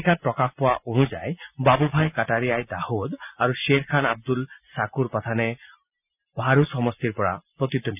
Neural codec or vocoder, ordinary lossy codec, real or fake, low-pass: none; none; real; 3.6 kHz